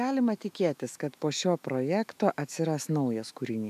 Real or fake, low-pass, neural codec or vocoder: fake; 14.4 kHz; autoencoder, 48 kHz, 128 numbers a frame, DAC-VAE, trained on Japanese speech